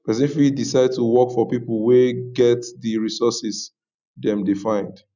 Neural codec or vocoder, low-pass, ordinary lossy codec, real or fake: none; 7.2 kHz; none; real